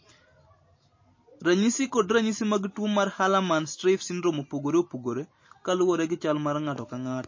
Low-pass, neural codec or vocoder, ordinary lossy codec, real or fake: 7.2 kHz; none; MP3, 32 kbps; real